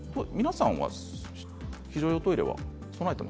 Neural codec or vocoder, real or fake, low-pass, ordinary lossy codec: none; real; none; none